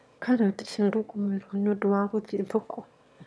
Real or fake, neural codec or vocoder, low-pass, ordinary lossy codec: fake; autoencoder, 22.05 kHz, a latent of 192 numbers a frame, VITS, trained on one speaker; none; none